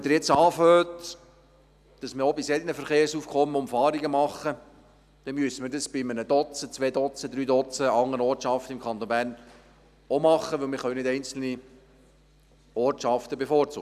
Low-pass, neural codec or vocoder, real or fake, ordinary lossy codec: 14.4 kHz; none; real; none